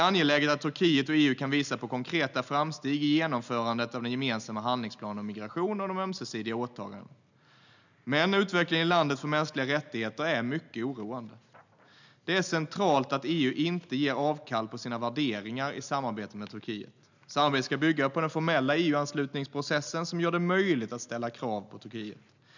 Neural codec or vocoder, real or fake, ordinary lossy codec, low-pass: none; real; none; 7.2 kHz